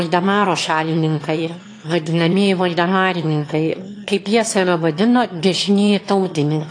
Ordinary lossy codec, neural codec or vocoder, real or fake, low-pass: AAC, 48 kbps; autoencoder, 22.05 kHz, a latent of 192 numbers a frame, VITS, trained on one speaker; fake; 9.9 kHz